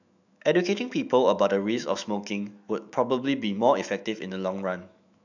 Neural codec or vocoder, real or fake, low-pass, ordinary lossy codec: autoencoder, 48 kHz, 128 numbers a frame, DAC-VAE, trained on Japanese speech; fake; 7.2 kHz; none